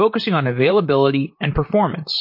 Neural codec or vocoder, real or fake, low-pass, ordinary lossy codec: vocoder, 44.1 kHz, 128 mel bands, Pupu-Vocoder; fake; 5.4 kHz; MP3, 32 kbps